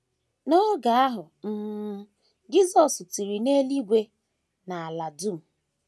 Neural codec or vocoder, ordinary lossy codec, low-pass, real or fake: none; none; none; real